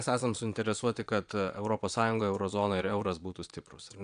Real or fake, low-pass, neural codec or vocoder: fake; 9.9 kHz; vocoder, 22.05 kHz, 80 mel bands, Vocos